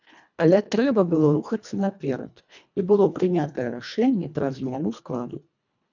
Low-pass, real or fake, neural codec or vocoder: 7.2 kHz; fake; codec, 24 kHz, 1.5 kbps, HILCodec